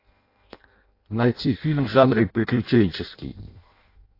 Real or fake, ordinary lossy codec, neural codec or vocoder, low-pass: fake; AAC, 32 kbps; codec, 16 kHz in and 24 kHz out, 0.6 kbps, FireRedTTS-2 codec; 5.4 kHz